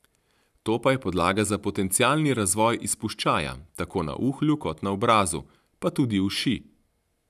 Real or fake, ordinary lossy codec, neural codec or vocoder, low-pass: real; none; none; 14.4 kHz